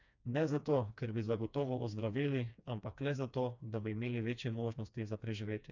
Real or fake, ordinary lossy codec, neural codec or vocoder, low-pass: fake; none; codec, 16 kHz, 2 kbps, FreqCodec, smaller model; 7.2 kHz